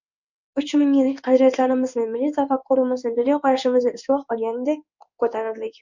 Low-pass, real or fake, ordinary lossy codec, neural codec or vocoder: 7.2 kHz; fake; MP3, 64 kbps; codec, 16 kHz in and 24 kHz out, 1 kbps, XY-Tokenizer